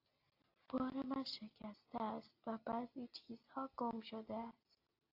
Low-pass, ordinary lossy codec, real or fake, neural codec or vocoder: 5.4 kHz; AAC, 48 kbps; real; none